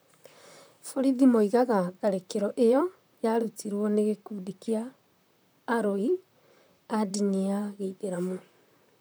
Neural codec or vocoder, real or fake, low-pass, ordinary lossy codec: vocoder, 44.1 kHz, 128 mel bands, Pupu-Vocoder; fake; none; none